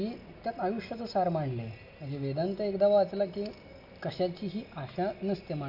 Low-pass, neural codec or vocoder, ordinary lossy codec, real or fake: 5.4 kHz; none; none; real